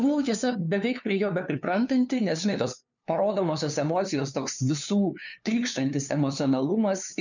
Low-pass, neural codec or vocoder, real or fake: 7.2 kHz; codec, 16 kHz, 2 kbps, FunCodec, trained on LibriTTS, 25 frames a second; fake